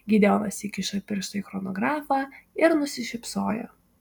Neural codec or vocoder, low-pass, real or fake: none; 19.8 kHz; real